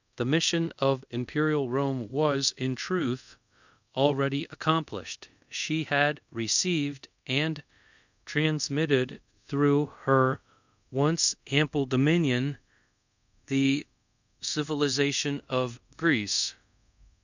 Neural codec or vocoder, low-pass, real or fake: codec, 24 kHz, 0.5 kbps, DualCodec; 7.2 kHz; fake